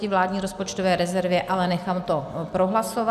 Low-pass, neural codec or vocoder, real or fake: 14.4 kHz; none; real